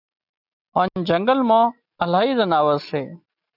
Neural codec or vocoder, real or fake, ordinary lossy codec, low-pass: none; real; AAC, 48 kbps; 5.4 kHz